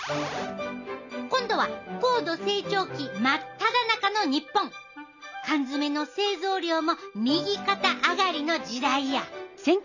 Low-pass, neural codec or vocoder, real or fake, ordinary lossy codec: 7.2 kHz; none; real; none